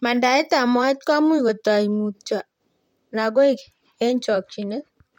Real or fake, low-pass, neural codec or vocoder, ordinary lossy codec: fake; 19.8 kHz; vocoder, 44.1 kHz, 128 mel bands, Pupu-Vocoder; MP3, 64 kbps